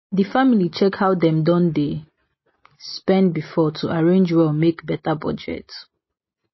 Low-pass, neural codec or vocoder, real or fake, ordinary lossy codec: 7.2 kHz; none; real; MP3, 24 kbps